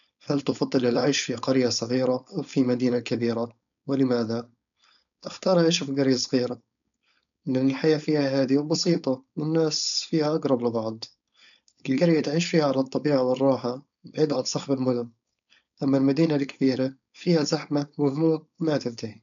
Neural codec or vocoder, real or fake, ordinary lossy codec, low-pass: codec, 16 kHz, 4.8 kbps, FACodec; fake; none; 7.2 kHz